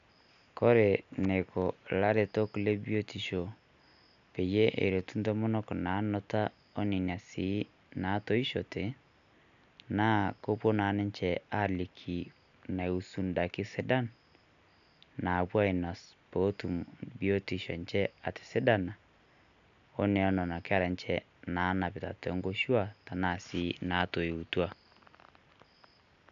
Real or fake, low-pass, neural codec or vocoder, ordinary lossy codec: real; 7.2 kHz; none; none